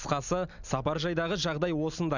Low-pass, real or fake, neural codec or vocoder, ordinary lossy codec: 7.2 kHz; real; none; none